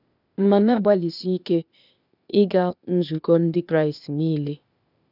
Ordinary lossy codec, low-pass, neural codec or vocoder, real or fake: none; 5.4 kHz; codec, 16 kHz, 0.8 kbps, ZipCodec; fake